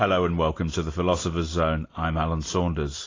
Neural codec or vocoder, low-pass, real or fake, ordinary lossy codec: none; 7.2 kHz; real; AAC, 32 kbps